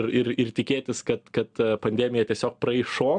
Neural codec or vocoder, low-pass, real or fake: none; 9.9 kHz; real